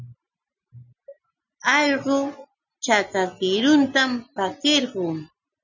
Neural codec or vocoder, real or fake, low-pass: none; real; 7.2 kHz